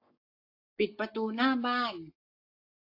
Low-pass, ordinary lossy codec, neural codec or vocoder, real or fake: 5.4 kHz; AAC, 32 kbps; codec, 44.1 kHz, 7.8 kbps, Pupu-Codec; fake